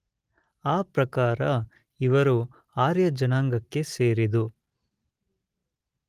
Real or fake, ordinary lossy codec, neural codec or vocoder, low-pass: real; Opus, 24 kbps; none; 14.4 kHz